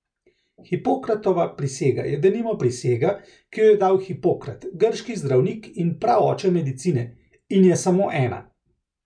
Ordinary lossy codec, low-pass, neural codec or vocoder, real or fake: none; 9.9 kHz; none; real